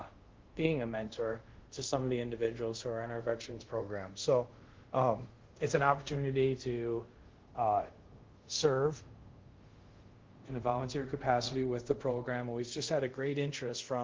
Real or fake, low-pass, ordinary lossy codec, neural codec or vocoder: fake; 7.2 kHz; Opus, 16 kbps; codec, 24 kHz, 0.5 kbps, DualCodec